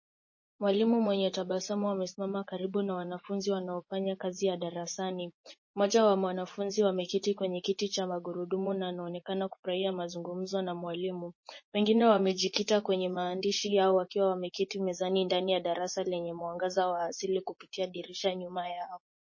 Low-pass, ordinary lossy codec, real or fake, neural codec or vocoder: 7.2 kHz; MP3, 32 kbps; fake; vocoder, 24 kHz, 100 mel bands, Vocos